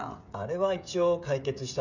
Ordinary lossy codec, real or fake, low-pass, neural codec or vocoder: none; fake; 7.2 kHz; codec, 16 kHz, 16 kbps, FreqCodec, smaller model